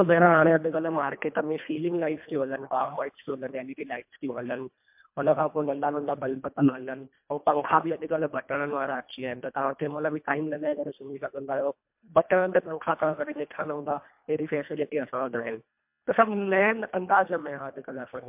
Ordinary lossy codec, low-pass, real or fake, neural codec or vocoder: MP3, 32 kbps; 3.6 kHz; fake; codec, 24 kHz, 1.5 kbps, HILCodec